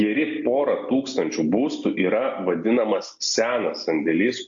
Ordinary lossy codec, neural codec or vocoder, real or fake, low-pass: AAC, 64 kbps; none; real; 7.2 kHz